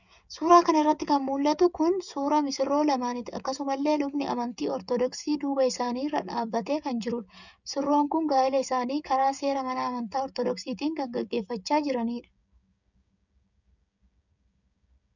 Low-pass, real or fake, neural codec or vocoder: 7.2 kHz; fake; codec, 16 kHz, 16 kbps, FreqCodec, smaller model